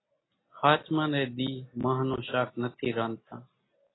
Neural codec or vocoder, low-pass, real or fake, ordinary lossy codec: none; 7.2 kHz; real; AAC, 16 kbps